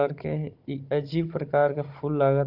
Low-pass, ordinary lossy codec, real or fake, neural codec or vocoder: 5.4 kHz; Opus, 32 kbps; fake; codec, 16 kHz, 16 kbps, FunCodec, trained on Chinese and English, 50 frames a second